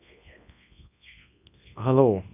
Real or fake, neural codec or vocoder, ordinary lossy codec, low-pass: fake; codec, 24 kHz, 0.9 kbps, WavTokenizer, large speech release; none; 3.6 kHz